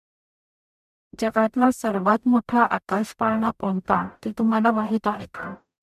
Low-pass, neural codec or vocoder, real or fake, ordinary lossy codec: 14.4 kHz; codec, 44.1 kHz, 0.9 kbps, DAC; fake; MP3, 96 kbps